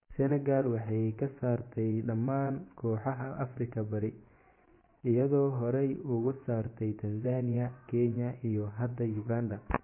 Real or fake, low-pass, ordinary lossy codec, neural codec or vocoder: fake; 3.6 kHz; MP3, 24 kbps; vocoder, 24 kHz, 100 mel bands, Vocos